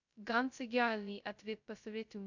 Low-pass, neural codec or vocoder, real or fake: 7.2 kHz; codec, 16 kHz, 0.2 kbps, FocalCodec; fake